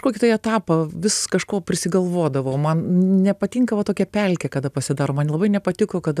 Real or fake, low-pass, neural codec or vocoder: real; 14.4 kHz; none